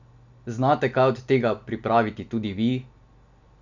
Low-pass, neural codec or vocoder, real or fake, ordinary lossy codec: 7.2 kHz; none; real; none